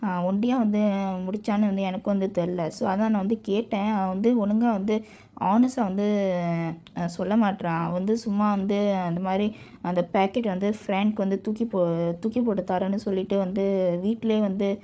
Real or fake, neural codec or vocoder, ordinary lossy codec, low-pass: fake; codec, 16 kHz, 8 kbps, FreqCodec, larger model; none; none